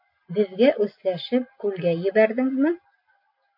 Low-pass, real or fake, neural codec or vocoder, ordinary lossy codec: 5.4 kHz; real; none; MP3, 32 kbps